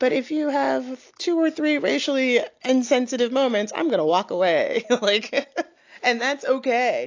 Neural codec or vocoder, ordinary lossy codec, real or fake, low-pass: none; AAC, 48 kbps; real; 7.2 kHz